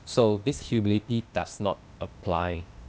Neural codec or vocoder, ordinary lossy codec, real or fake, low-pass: codec, 16 kHz, 0.8 kbps, ZipCodec; none; fake; none